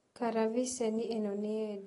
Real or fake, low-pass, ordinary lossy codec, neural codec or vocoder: real; 10.8 kHz; AAC, 32 kbps; none